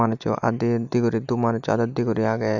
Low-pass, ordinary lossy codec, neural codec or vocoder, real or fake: 7.2 kHz; none; none; real